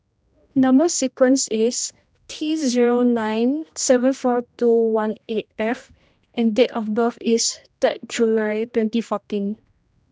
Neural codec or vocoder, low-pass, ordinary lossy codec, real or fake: codec, 16 kHz, 1 kbps, X-Codec, HuBERT features, trained on general audio; none; none; fake